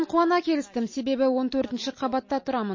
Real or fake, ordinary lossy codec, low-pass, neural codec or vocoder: real; MP3, 32 kbps; 7.2 kHz; none